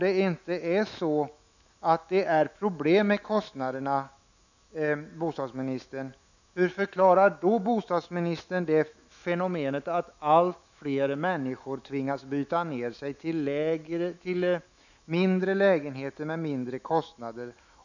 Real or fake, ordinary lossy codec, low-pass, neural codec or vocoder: fake; none; 7.2 kHz; autoencoder, 48 kHz, 128 numbers a frame, DAC-VAE, trained on Japanese speech